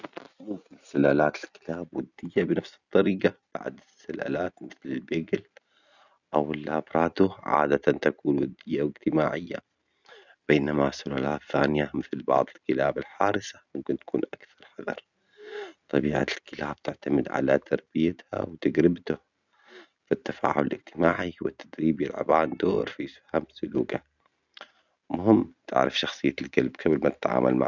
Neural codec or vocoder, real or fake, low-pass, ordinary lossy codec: none; real; 7.2 kHz; none